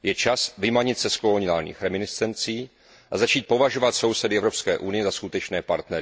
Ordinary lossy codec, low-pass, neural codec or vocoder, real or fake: none; none; none; real